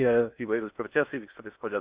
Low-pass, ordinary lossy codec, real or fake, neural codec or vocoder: 3.6 kHz; Opus, 64 kbps; fake; codec, 16 kHz in and 24 kHz out, 0.6 kbps, FocalCodec, streaming, 4096 codes